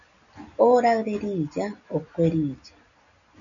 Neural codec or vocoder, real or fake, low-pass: none; real; 7.2 kHz